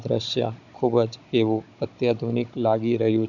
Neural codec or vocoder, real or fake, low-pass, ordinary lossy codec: codec, 16 kHz, 16 kbps, FunCodec, trained on Chinese and English, 50 frames a second; fake; 7.2 kHz; none